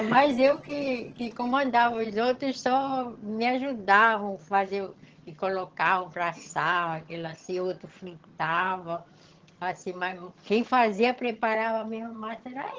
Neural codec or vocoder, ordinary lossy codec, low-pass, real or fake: vocoder, 22.05 kHz, 80 mel bands, HiFi-GAN; Opus, 16 kbps; 7.2 kHz; fake